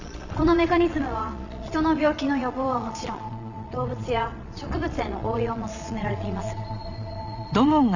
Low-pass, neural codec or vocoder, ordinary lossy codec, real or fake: 7.2 kHz; vocoder, 22.05 kHz, 80 mel bands, Vocos; none; fake